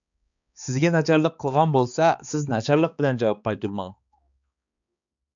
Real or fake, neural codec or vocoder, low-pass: fake; codec, 16 kHz, 2 kbps, X-Codec, HuBERT features, trained on balanced general audio; 7.2 kHz